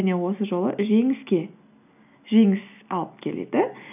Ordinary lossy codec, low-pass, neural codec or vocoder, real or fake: none; 3.6 kHz; none; real